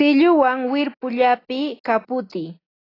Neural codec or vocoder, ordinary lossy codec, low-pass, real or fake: none; AAC, 24 kbps; 5.4 kHz; real